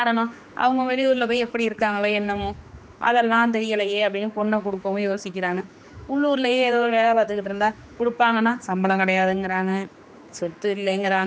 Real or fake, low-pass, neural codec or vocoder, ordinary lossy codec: fake; none; codec, 16 kHz, 2 kbps, X-Codec, HuBERT features, trained on general audio; none